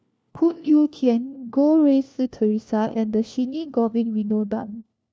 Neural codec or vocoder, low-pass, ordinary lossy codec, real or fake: codec, 16 kHz, 1 kbps, FunCodec, trained on LibriTTS, 50 frames a second; none; none; fake